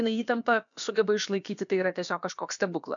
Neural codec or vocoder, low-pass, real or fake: codec, 16 kHz, about 1 kbps, DyCAST, with the encoder's durations; 7.2 kHz; fake